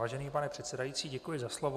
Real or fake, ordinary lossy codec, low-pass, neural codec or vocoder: real; AAC, 96 kbps; 14.4 kHz; none